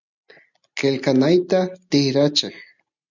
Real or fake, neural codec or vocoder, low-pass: real; none; 7.2 kHz